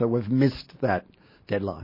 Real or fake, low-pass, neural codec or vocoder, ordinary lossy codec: real; 5.4 kHz; none; MP3, 24 kbps